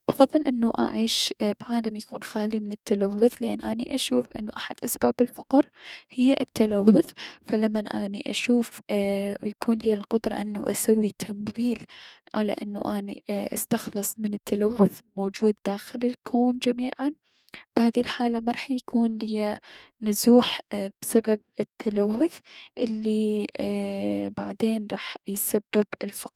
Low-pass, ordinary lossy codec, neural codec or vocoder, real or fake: 19.8 kHz; none; codec, 44.1 kHz, 2.6 kbps, DAC; fake